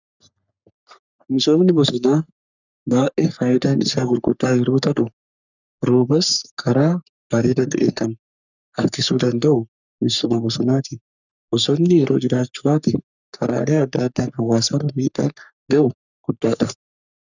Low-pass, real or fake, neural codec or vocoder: 7.2 kHz; fake; codec, 44.1 kHz, 3.4 kbps, Pupu-Codec